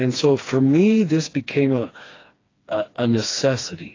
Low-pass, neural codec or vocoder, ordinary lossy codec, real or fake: 7.2 kHz; codec, 16 kHz, 2 kbps, FreqCodec, smaller model; AAC, 32 kbps; fake